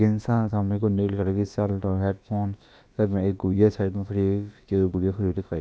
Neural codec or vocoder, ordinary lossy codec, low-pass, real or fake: codec, 16 kHz, about 1 kbps, DyCAST, with the encoder's durations; none; none; fake